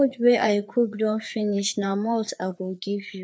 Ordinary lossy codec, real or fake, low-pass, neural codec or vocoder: none; fake; none; codec, 16 kHz, 4 kbps, FreqCodec, larger model